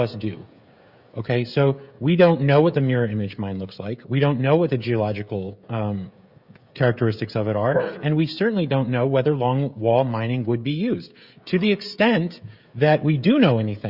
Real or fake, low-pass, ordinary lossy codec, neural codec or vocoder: fake; 5.4 kHz; Opus, 64 kbps; codec, 16 kHz, 16 kbps, FreqCodec, smaller model